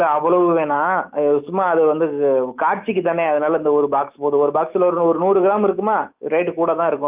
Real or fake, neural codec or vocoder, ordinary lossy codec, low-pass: fake; autoencoder, 48 kHz, 128 numbers a frame, DAC-VAE, trained on Japanese speech; Opus, 24 kbps; 3.6 kHz